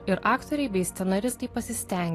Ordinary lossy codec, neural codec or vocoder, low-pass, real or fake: AAC, 48 kbps; none; 14.4 kHz; real